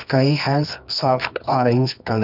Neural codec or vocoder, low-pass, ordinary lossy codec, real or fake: codec, 24 kHz, 0.9 kbps, WavTokenizer, medium music audio release; 5.4 kHz; none; fake